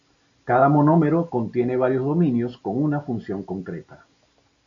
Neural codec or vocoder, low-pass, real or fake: none; 7.2 kHz; real